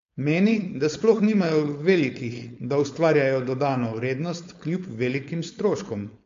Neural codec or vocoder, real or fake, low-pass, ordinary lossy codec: codec, 16 kHz, 4.8 kbps, FACodec; fake; 7.2 kHz; MP3, 48 kbps